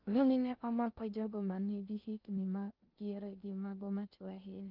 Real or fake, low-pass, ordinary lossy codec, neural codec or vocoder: fake; 5.4 kHz; Opus, 24 kbps; codec, 16 kHz in and 24 kHz out, 0.6 kbps, FocalCodec, streaming, 2048 codes